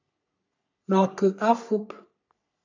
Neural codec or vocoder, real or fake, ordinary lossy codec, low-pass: codec, 44.1 kHz, 3.4 kbps, Pupu-Codec; fake; AAC, 48 kbps; 7.2 kHz